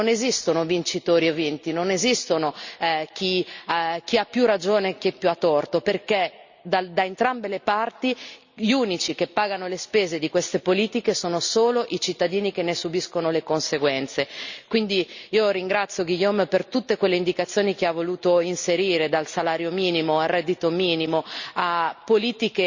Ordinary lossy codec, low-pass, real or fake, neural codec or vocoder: Opus, 64 kbps; 7.2 kHz; real; none